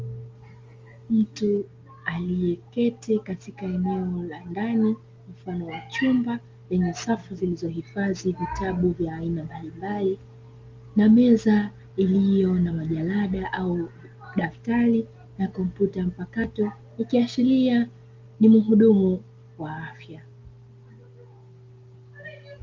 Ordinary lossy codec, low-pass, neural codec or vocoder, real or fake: Opus, 32 kbps; 7.2 kHz; none; real